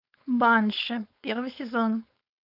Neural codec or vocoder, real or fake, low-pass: codec, 16 kHz, 4.8 kbps, FACodec; fake; 5.4 kHz